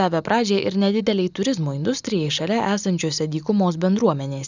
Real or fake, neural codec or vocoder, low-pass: real; none; 7.2 kHz